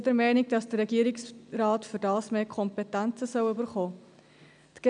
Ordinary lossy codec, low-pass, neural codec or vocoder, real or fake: none; 9.9 kHz; none; real